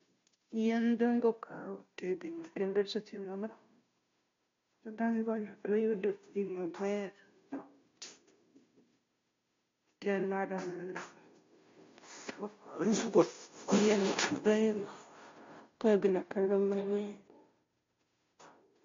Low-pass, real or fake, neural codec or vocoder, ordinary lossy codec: 7.2 kHz; fake; codec, 16 kHz, 0.5 kbps, FunCodec, trained on Chinese and English, 25 frames a second; MP3, 48 kbps